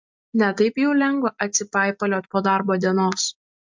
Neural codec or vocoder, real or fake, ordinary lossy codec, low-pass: none; real; MP3, 64 kbps; 7.2 kHz